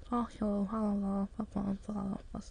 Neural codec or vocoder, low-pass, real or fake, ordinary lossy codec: autoencoder, 22.05 kHz, a latent of 192 numbers a frame, VITS, trained on many speakers; 9.9 kHz; fake; MP3, 64 kbps